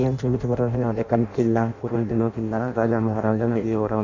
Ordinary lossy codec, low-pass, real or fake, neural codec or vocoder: Opus, 64 kbps; 7.2 kHz; fake; codec, 16 kHz in and 24 kHz out, 0.6 kbps, FireRedTTS-2 codec